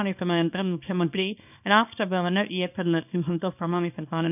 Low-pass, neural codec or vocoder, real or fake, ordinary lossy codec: 3.6 kHz; codec, 24 kHz, 0.9 kbps, WavTokenizer, small release; fake; none